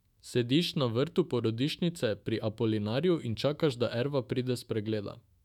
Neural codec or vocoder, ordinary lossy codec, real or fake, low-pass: autoencoder, 48 kHz, 128 numbers a frame, DAC-VAE, trained on Japanese speech; none; fake; 19.8 kHz